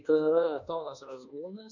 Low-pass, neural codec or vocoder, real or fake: 7.2 kHz; codec, 24 kHz, 1.2 kbps, DualCodec; fake